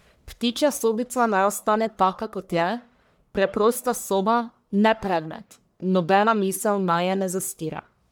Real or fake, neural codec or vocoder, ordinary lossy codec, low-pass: fake; codec, 44.1 kHz, 1.7 kbps, Pupu-Codec; none; none